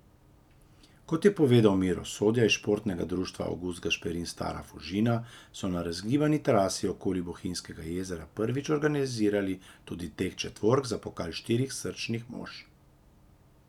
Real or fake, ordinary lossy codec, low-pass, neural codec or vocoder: real; none; 19.8 kHz; none